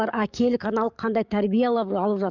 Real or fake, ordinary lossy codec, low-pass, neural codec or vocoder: fake; none; 7.2 kHz; codec, 44.1 kHz, 7.8 kbps, DAC